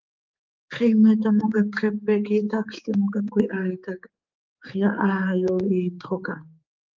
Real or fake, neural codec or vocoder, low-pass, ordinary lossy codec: fake; codec, 16 kHz, 4 kbps, X-Codec, HuBERT features, trained on general audio; 7.2 kHz; Opus, 24 kbps